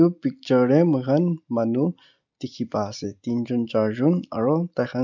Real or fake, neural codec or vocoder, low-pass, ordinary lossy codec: real; none; 7.2 kHz; none